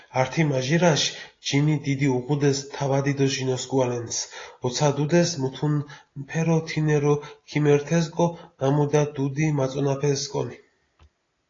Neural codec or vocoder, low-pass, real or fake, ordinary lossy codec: none; 7.2 kHz; real; AAC, 32 kbps